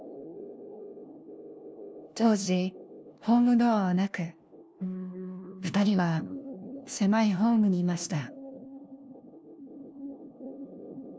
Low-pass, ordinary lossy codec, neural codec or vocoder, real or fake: none; none; codec, 16 kHz, 1 kbps, FunCodec, trained on LibriTTS, 50 frames a second; fake